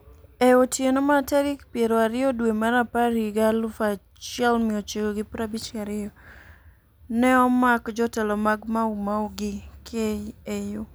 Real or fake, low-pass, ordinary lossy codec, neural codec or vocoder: real; none; none; none